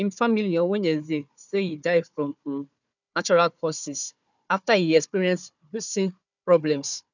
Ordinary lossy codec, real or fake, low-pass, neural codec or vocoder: none; fake; 7.2 kHz; codec, 16 kHz, 4 kbps, FunCodec, trained on Chinese and English, 50 frames a second